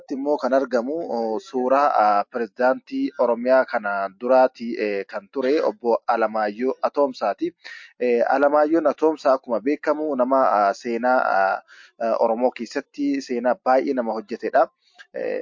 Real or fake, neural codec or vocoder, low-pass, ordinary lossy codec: real; none; 7.2 kHz; MP3, 48 kbps